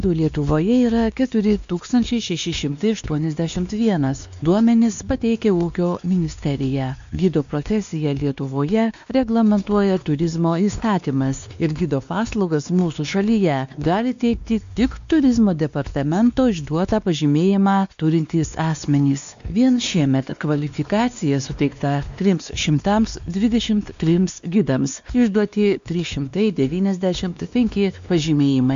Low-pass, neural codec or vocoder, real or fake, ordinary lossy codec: 7.2 kHz; codec, 16 kHz, 2 kbps, X-Codec, WavLM features, trained on Multilingual LibriSpeech; fake; MP3, 64 kbps